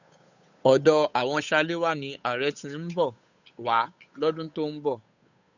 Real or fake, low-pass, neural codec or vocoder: fake; 7.2 kHz; codec, 16 kHz, 8 kbps, FunCodec, trained on Chinese and English, 25 frames a second